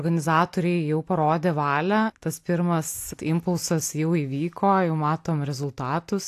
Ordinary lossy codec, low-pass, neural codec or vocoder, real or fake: AAC, 64 kbps; 14.4 kHz; none; real